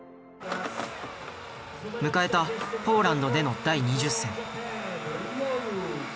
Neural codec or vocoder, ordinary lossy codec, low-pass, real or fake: none; none; none; real